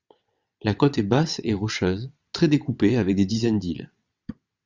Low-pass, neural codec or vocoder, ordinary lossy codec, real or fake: 7.2 kHz; vocoder, 22.05 kHz, 80 mel bands, WaveNeXt; Opus, 64 kbps; fake